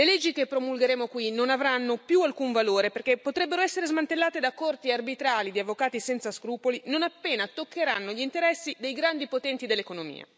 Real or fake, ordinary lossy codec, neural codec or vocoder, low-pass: real; none; none; none